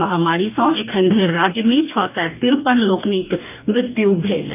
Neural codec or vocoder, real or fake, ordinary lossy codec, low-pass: codec, 44.1 kHz, 2.6 kbps, DAC; fake; none; 3.6 kHz